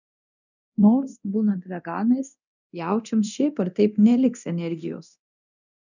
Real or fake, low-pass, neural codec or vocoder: fake; 7.2 kHz; codec, 24 kHz, 0.9 kbps, DualCodec